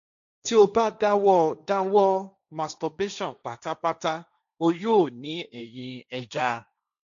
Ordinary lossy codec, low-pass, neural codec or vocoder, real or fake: none; 7.2 kHz; codec, 16 kHz, 1.1 kbps, Voila-Tokenizer; fake